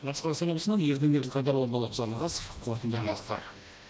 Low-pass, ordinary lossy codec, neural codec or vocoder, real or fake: none; none; codec, 16 kHz, 1 kbps, FreqCodec, smaller model; fake